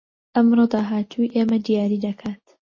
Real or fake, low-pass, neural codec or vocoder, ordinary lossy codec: real; 7.2 kHz; none; MP3, 32 kbps